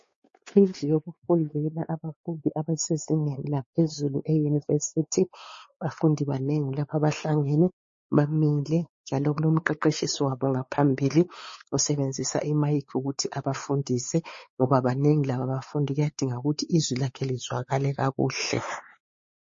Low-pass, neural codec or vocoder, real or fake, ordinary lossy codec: 7.2 kHz; codec, 16 kHz, 4 kbps, X-Codec, WavLM features, trained on Multilingual LibriSpeech; fake; MP3, 32 kbps